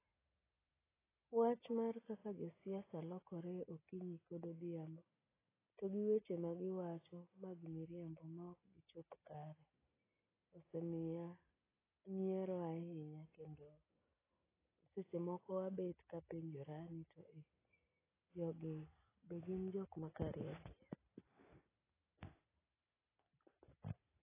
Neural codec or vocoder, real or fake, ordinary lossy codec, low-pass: none; real; AAC, 16 kbps; 3.6 kHz